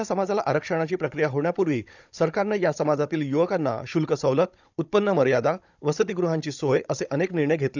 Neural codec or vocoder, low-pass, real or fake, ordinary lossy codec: codec, 16 kHz, 16 kbps, FunCodec, trained on Chinese and English, 50 frames a second; 7.2 kHz; fake; none